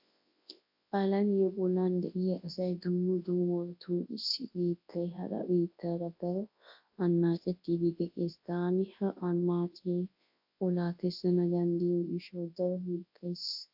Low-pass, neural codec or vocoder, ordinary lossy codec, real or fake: 5.4 kHz; codec, 24 kHz, 0.9 kbps, WavTokenizer, large speech release; AAC, 48 kbps; fake